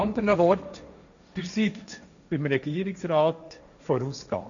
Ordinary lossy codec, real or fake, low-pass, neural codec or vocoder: none; fake; 7.2 kHz; codec, 16 kHz, 1.1 kbps, Voila-Tokenizer